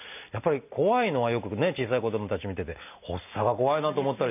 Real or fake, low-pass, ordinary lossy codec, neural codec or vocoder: real; 3.6 kHz; none; none